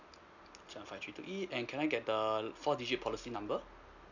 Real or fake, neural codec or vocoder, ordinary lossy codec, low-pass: real; none; none; 7.2 kHz